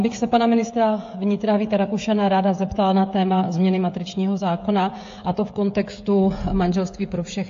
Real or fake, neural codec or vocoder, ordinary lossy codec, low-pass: fake; codec, 16 kHz, 16 kbps, FreqCodec, smaller model; AAC, 48 kbps; 7.2 kHz